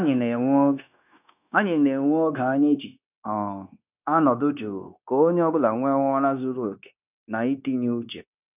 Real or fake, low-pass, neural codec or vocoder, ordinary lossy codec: fake; 3.6 kHz; codec, 16 kHz, 0.9 kbps, LongCat-Audio-Codec; none